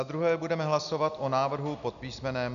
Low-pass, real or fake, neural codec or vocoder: 7.2 kHz; real; none